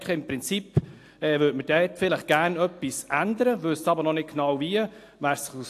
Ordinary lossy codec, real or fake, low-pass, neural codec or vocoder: AAC, 64 kbps; real; 14.4 kHz; none